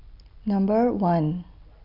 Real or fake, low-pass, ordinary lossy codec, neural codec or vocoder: real; 5.4 kHz; none; none